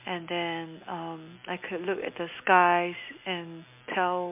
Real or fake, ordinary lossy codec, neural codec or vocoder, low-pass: real; MP3, 32 kbps; none; 3.6 kHz